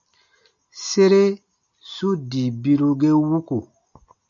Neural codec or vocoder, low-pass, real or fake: none; 7.2 kHz; real